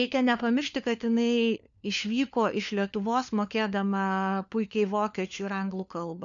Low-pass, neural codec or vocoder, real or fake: 7.2 kHz; codec, 16 kHz, 4 kbps, FunCodec, trained on LibriTTS, 50 frames a second; fake